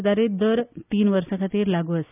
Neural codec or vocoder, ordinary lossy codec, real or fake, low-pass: none; none; real; 3.6 kHz